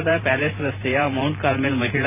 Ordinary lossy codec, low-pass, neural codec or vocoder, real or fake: none; 3.6 kHz; vocoder, 44.1 kHz, 128 mel bands every 512 samples, BigVGAN v2; fake